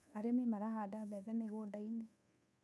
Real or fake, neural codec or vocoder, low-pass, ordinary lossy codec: fake; codec, 24 kHz, 1.2 kbps, DualCodec; none; none